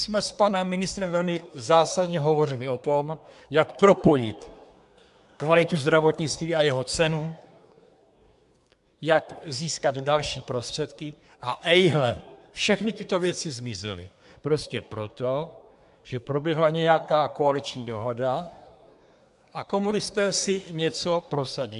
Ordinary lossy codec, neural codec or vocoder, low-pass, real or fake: AAC, 96 kbps; codec, 24 kHz, 1 kbps, SNAC; 10.8 kHz; fake